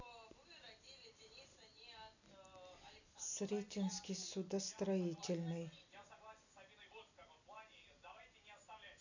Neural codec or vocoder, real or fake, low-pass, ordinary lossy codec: none; real; 7.2 kHz; none